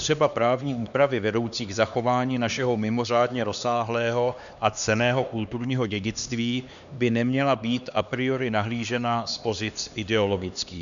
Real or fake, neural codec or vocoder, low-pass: fake; codec, 16 kHz, 2 kbps, X-Codec, HuBERT features, trained on LibriSpeech; 7.2 kHz